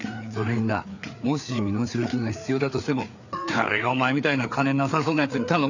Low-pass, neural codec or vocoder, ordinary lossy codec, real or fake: 7.2 kHz; codec, 16 kHz, 4 kbps, FreqCodec, larger model; none; fake